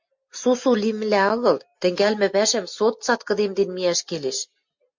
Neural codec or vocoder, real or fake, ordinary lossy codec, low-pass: none; real; MP3, 48 kbps; 7.2 kHz